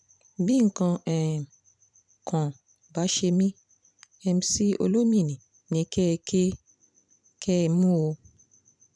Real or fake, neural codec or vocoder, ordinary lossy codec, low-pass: fake; vocoder, 44.1 kHz, 128 mel bands every 512 samples, BigVGAN v2; none; 9.9 kHz